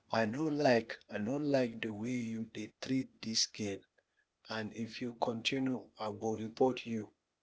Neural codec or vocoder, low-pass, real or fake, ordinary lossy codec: codec, 16 kHz, 0.8 kbps, ZipCodec; none; fake; none